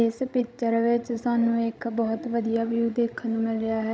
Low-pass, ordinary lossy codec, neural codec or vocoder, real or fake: none; none; codec, 16 kHz, 16 kbps, FreqCodec, larger model; fake